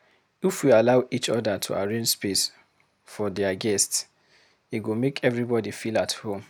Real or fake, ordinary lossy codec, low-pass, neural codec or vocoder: real; none; none; none